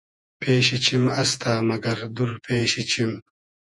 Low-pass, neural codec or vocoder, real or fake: 10.8 kHz; vocoder, 48 kHz, 128 mel bands, Vocos; fake